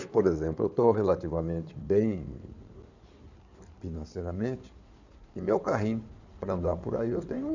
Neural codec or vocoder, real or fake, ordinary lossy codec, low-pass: codec, 16 kHz in and 24 kHz out, 2.2 kbps, FireRedTTS-2 codec; fake; none; 7.2 kHz